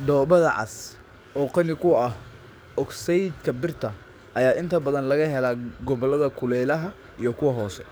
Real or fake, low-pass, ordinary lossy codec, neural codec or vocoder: fake; none; none; codec, 44.1 kHz, 7.8 kbps, DAC